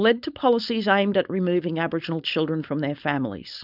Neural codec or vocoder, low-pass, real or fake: codec, 16 kHz, 4.8 kbps, FACodec; 5.4 kHz; fake